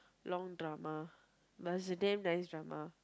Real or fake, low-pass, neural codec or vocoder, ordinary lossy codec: real; none; none; none